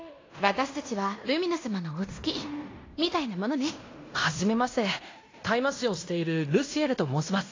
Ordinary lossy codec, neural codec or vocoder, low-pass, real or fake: AAC, 32 kbps; codec, 16 kHz in and 24 kHz out, 0.9 kbps, LongCat-Audio-Codec, fine tuned four codebook decoder; 7.2 kHz; fake